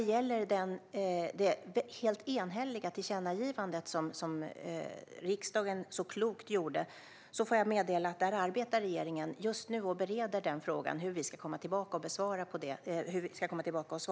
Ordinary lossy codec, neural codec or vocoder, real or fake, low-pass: none; none; real; none